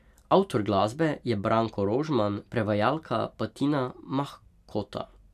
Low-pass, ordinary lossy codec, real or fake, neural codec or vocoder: 14.4 kHz; none; real; none